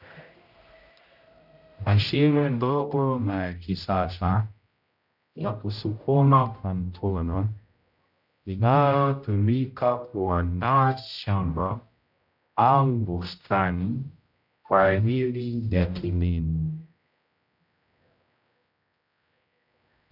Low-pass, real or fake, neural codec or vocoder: 5.4 kHz; fake; codec, 16 kHz, 0.5 kbps, X-Codec, HuBERT features, trained on general audio